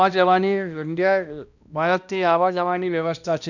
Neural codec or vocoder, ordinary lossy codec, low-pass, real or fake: codec, 16 kHz, 1 kbps, X-Codec, HuBERT features, trained on balanced general audio; none; 7.2 kHz; fake